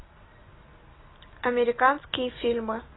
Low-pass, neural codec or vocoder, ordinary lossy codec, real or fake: 7.2 kHz; none; AAC, 16 kbps; real